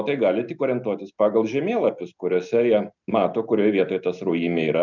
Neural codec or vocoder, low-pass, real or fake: none; 7.2 kHz; real